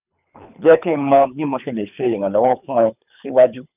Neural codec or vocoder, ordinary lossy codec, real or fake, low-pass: codec, 24 kHz, 3 kbps, HILCodec; none; fake; 3.6 kHz